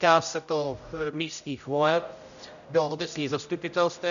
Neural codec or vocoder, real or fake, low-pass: codec, 16 kHz, 0.5 kbps, X-Codec, HuBERT features, trained on general audio; fake; 7.2 kHz